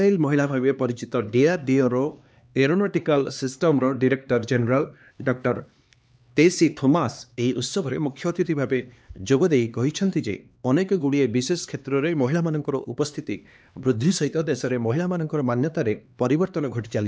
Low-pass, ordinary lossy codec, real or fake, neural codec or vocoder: none; none; fake; codec, 16 kHz, 2 kbps, X-Codec, HuBERT features, trained on LibriSpeech